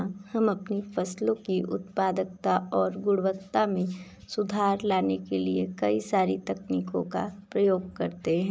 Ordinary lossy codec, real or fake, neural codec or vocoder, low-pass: none; real; none; none